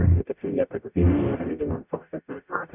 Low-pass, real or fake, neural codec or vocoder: 3.6 kHz; fake; codec, 44.1 kHz, 0.9 kbps, DAC